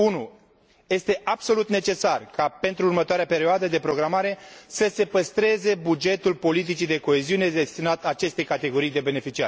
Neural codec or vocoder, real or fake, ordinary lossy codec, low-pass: none; real; none; none